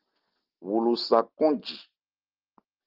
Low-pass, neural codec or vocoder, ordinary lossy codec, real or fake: 5.4 kHz; none; Opus, 32 kbps; real